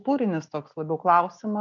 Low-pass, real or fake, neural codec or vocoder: 7.2 kHz; real; none